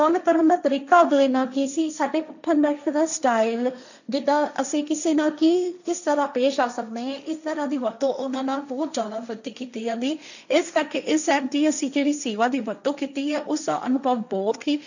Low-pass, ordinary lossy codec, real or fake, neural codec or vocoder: 7.2 kHz; none; fake; codec, 16 kHz, 1.1 kbps, Voila-Tokenizer